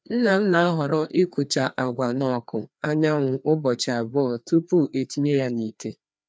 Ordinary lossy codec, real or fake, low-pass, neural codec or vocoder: none; fake; none; codec, 16 kHz, 2 kbps, FreqCodec, larger model